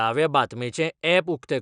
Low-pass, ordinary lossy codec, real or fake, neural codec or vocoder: 9.9 kHz; none; real; none